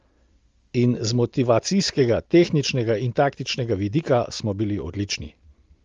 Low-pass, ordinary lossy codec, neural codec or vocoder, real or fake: 7.2 kHz; Opus, 32 kbps; none; real